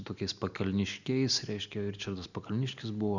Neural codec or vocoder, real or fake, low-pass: none; real; 7.2 kHz